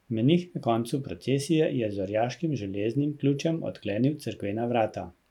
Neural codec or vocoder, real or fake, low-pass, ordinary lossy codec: vocoder, 44.1 kHz, 128 mel bands every 512 samples, BigVGAN v2; fake; 19.8 kHz; none